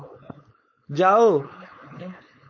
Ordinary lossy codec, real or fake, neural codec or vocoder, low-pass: MP3, 32 kbps; fake; codec, 16 kHz, 4.8 kbps, FACodec; 7.2 kHz